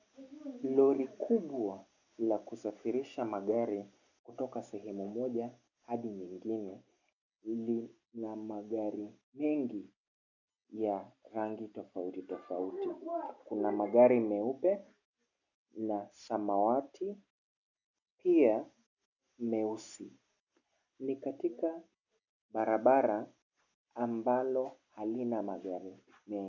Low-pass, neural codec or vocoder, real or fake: 7.2 kHz; none; real